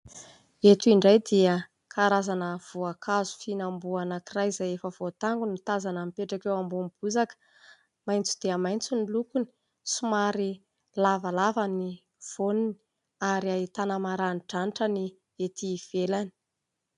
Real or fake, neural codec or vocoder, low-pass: real; none; 10.8 kHz